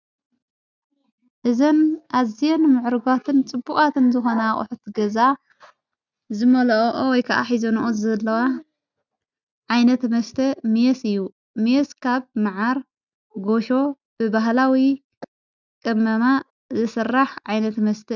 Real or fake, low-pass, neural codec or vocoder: real; 7.2 kHz; none